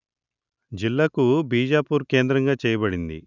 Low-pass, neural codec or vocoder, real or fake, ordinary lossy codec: 7.2 kHz; none; real; none